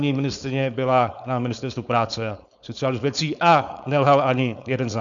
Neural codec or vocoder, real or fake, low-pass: codec, 16 kHz, 4.8 kbps, FACodec; fake; 7.2 kHz